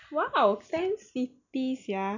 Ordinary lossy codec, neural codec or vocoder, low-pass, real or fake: none; none; 7.2 kHz; real